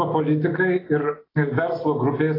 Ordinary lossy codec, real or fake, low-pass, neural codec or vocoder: AAC, 24 kbps; real; 5.4 kHz; none